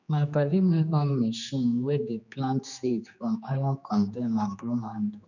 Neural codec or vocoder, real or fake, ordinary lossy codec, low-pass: codec, 16 kHz, 2 kbps, X-Codec, HuBERT features, trained on general audio; fake; none; 7.2 kHz